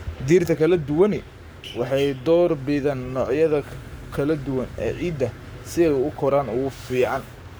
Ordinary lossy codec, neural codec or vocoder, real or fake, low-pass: none; codec, 44.1 kHz, 7.8 kbps, Pupu-Codec; fake; none